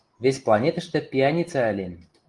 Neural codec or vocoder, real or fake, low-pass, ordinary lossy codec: none; real; 10.8 kHz; Opus, 24 kbps